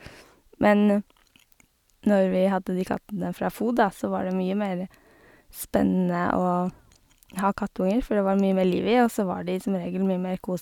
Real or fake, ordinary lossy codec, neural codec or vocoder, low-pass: real; none; none; 19.8 kHz